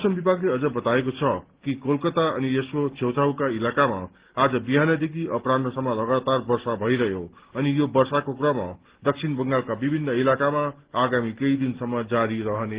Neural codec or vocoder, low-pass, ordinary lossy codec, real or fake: none; 3.6 kHz; Opus, 16 kbps; real